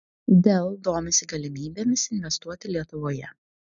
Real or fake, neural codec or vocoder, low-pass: real; none; 7.2 kHz